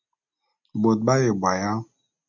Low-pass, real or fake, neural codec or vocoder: 7.2 kHz; real; none